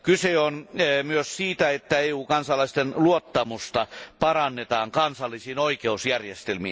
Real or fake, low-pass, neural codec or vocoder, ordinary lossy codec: real; none; none; none